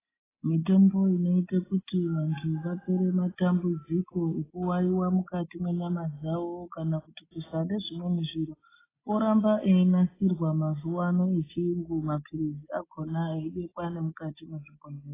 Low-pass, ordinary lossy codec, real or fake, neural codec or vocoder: 3.6 kHz; AAC, 16 kbps; real; none